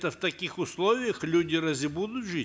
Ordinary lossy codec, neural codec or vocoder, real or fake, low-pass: none; none; real; none